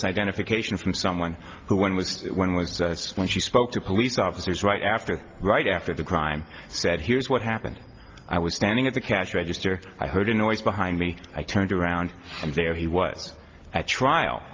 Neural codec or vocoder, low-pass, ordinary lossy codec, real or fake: none; 7.2 kHz; Opus, 32 kbps; real